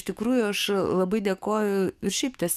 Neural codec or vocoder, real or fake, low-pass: codec, 44.1 kHz, 7.8 kbps, DAC; fake; 14.4 kHz